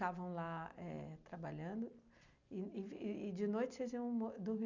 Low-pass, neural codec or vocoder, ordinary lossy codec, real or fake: 7.2 kHz; none; none; real